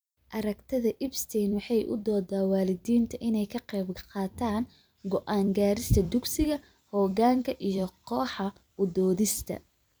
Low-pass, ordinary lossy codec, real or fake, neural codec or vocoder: none; none; fake; vocoder, 44.1 kHz, 128 mel bands every 256 samples, BigVGAN v2